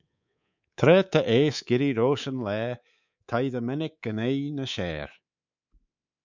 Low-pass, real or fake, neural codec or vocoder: 7.2 kHz; fake; codec, 24 kHz, 3.1 kbps, DualCodec